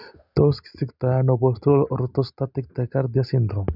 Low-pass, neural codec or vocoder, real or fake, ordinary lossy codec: 5.4 kHz; none; real; none